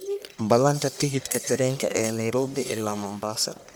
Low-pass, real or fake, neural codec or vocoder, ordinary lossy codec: none; fake; codec, 44.1 kHz, 1.7 kbps, Pupu-Codec; none